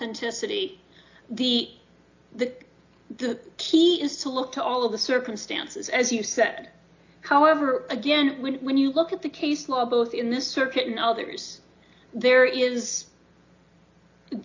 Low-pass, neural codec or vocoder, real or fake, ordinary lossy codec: 7.2 kHz; none; real; AAC, 48 kbps